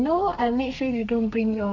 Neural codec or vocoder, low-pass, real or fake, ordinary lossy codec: codec, 32 kHz, 1.9 kbps, SNAC; 7.2 kHz; fake; none